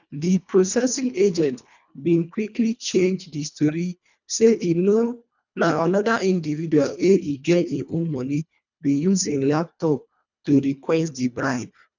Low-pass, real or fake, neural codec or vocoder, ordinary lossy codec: 7.2 kHz; fake; codec, 24 kHz, 1.5 kbps, HILCodec; none